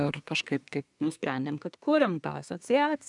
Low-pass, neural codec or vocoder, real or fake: 10.8 kHz; codec, 24 kHz, 1 kbps, SNAC; fake